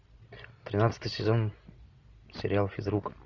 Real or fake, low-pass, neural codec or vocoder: real; 7.2 kHz; none